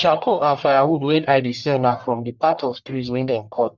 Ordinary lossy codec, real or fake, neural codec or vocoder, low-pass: Opus, 64 kbps; fake; codec, 44.1 kHz, 1.7 kbps, Pupu-Codec; 7.2 kHz